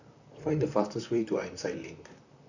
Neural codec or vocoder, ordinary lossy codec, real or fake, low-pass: vocoder, 44.1 kHz, 128 mel bands, Pupu-Vocoder; none; fake; 7.2 kHz